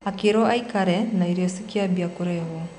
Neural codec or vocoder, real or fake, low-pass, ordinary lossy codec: none; real; 9.9 kHz; none